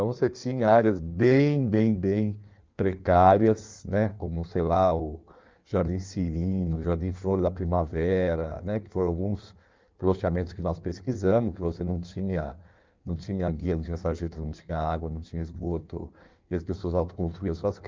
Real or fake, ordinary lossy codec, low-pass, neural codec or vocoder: fake; Opus, 24 kbps; 7.2 kHz; codec, 16 kHz in and 24 kHz out, 1.1 kbps, FireRedTTS-2 codec